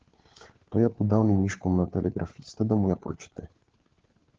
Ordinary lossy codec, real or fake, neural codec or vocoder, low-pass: Opus, 16 kbps; fake; codec, 16 kHz, 4 kbps, FunCodec, trained on LibriTTS, 50 frames a second; 7.2 kHz